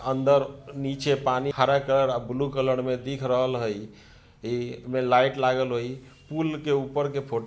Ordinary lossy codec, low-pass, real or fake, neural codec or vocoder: none; none; real; none